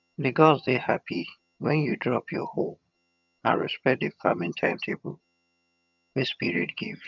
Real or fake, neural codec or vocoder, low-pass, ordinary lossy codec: fake; vocoder, 22.05 kHz, 80 mel bands, HiFi-GAN; 7.2 kHz; none